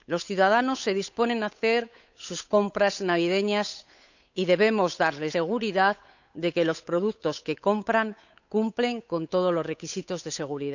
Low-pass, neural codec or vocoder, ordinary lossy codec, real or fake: 7.2 kHz; codec, 16 kHz, 8 kbps, FunCodec, trained on Chinese and English, 25 frames a second; none; fake